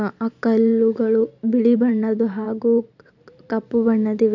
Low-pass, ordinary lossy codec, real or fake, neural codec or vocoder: 7.2 kHz; none; fake; vocoder, 44.1 kHz, 128 mel bands every 512 samples, BigVGAN v2